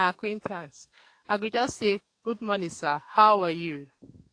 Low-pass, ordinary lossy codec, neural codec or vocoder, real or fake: 9.9 kHz; AAC, 48 kbps; codec, 44.1 kHz, 2.6 kbps, SNAC; fake